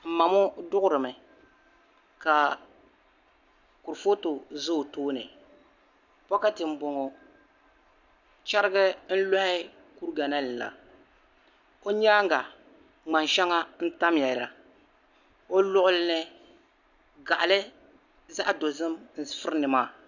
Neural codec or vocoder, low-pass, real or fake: none; 7.2 kHz; real